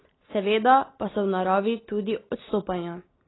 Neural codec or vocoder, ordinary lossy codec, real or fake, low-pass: none; AAC, 16 kbps; real; 7.2 kHz